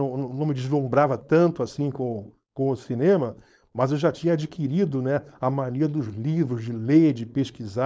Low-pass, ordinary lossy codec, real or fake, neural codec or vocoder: none; none; fake; codec, 16 kHz, 4.8 kbps, FACodec